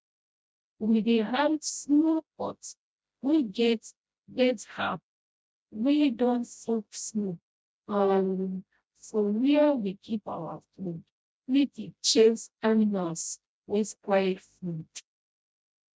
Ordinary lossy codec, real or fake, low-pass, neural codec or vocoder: none; fake; none; codec, 16 kHz, 0.5 kbps, FreqCodec, smaller model